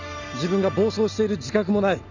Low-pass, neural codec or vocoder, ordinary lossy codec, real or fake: 7.2 kHz; none; none; real